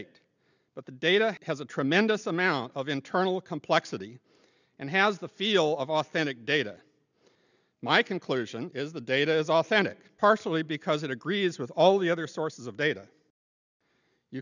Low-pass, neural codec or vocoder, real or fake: 7.2 kHz; none; real